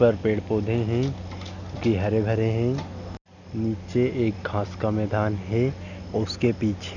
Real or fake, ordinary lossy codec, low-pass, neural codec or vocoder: real; none; 7.2 kHz; none